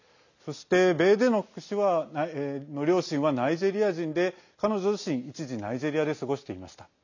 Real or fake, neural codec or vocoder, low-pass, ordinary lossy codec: real; none; 7.2 kHz; none